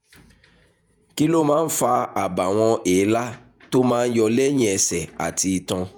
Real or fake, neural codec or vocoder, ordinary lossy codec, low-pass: fake; vocoder, 48 kHz, 128 mel bands, Vocos; none; none